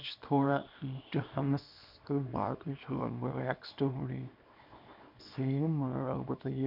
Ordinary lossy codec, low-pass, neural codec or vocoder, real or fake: none; 5.4 kHz; codec, 24 kHz, 0.9 kbps, WavTokenizer, small release; fake